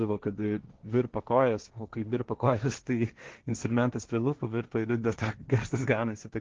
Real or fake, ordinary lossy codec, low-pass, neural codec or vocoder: fake; Opus, 16 kbps; 7.2 kHz; codec, 16 kHz, 1.1 kbps, Voila-Tokenizer